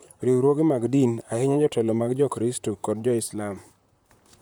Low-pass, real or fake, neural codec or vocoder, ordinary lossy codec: none; fake; vocoder, 44.1 kHz, 128 mel bands, Pupu-Vocoder; none